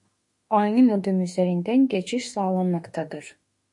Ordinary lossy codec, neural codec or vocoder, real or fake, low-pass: MP3, 48 kbps; autoencoder, 48 kHz, 32 numbers a frame, DAC-VAE, trained on Japanese speech; fake; 10.8 kHz